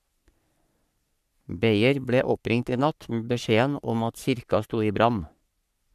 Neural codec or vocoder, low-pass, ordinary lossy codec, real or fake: codec, 44.1 kHz, 3.4 kbps, Pupu-Codec; 14.4 kHz; none; fake